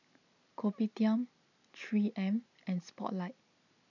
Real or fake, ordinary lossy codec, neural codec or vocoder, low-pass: real; none; none; 7.2 kHz